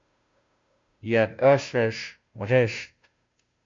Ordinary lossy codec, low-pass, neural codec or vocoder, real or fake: MP3, 48 kbps; 7.2 kHz; codec, 16 kHz, 0.5 kbps, FunCodec, trained on Chinese and English, 25 frames a second; fake